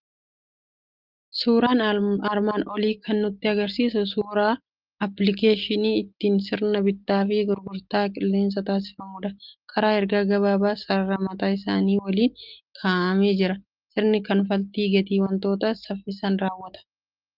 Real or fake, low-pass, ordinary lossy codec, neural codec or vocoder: real; 5.4 kHz; Opus, 32 kbps; none